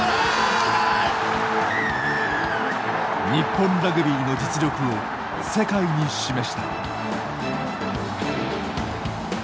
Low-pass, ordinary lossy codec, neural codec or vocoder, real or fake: none; none; none; real